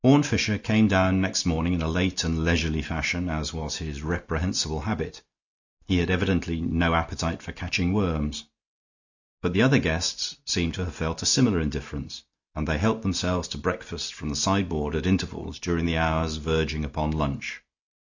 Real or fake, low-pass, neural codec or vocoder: real; 7.2 kHz; none